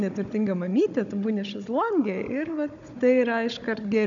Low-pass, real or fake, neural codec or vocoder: 7.2 kHz; fake; codec, 16 kHz, 16 kbps, FunCodec, trained on Chinese and English, 50 frames a second